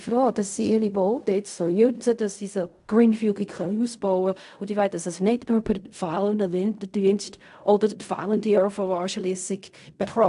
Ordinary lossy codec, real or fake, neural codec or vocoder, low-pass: AAC, 96 kbps; fake; codec, 16 kHz in and 24 kHz out, 0.4 kbps, LongCat-Audio-Codec, fine tuned four codebook decoder; 10.8 kHz